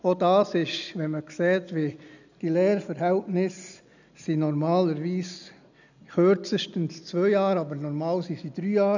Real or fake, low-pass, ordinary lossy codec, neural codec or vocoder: real; 7.2 kHz; none; none